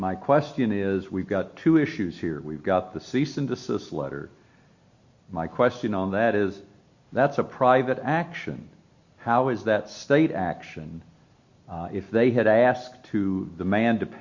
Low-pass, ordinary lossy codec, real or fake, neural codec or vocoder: 7.2 kHz; Opus, 64 kbps; real; none